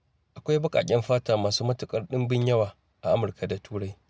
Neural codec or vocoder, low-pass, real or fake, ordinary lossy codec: none; none; real; none